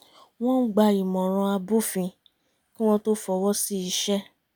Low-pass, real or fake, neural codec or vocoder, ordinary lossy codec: none; real; none; none